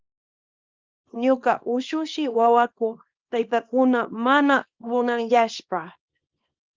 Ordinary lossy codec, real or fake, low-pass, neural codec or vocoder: Opus, 32 kbps; fake; 7.2 kHz; codec, 24 kHz, 0.9 kbps, WavTokenizer, small release